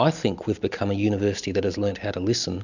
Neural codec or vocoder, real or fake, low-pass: none; real; 7.2 kHz